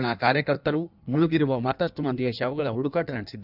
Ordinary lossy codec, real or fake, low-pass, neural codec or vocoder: none; fake; 5.4 kHz; codec, 16 kHz in and 24 kHz out, 1.1 kbps, FireRedTTS-2 codec